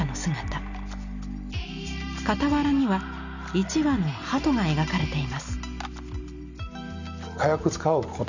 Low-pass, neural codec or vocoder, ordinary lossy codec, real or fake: 7.2 kHz; none; none; real